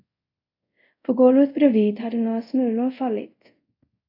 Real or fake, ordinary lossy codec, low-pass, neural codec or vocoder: fake; AAC, 32 kbps; 5.4 kHz; codec, 24 kHz, 0.5 kbps, DualCodec